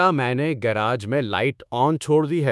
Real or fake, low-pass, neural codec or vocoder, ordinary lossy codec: fake; none; codec, 24 kHz, 1.2 kbps, DualCodec; none